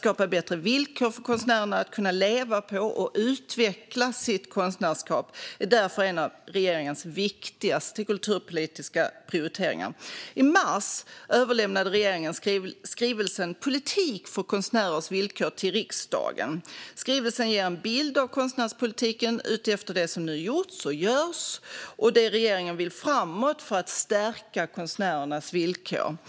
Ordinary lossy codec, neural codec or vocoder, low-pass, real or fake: none; none; none; real